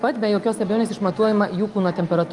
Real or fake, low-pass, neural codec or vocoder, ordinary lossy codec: real; 10.8 kHz; none; Opus, 32 kbps